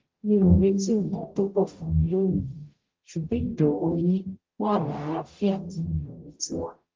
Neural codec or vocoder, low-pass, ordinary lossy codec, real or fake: codec, 44.1 kHz, 0.9 kbps, DAC; 7.2 kHz; Opus, 32 kbps; fake